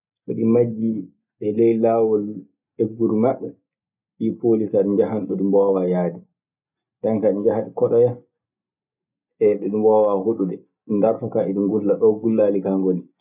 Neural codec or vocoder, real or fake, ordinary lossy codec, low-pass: none; real; none; 3.6 kHz